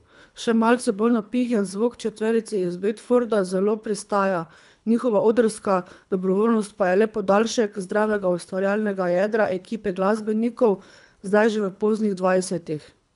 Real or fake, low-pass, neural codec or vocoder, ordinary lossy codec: fake; 10.8 kHz; codec, 24 kHz, 3 kbps, HILCodec; MP3, 96 kbps